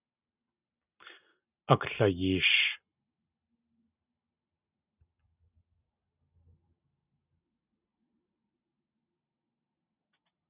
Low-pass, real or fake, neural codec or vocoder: 3.6 kHz; real; none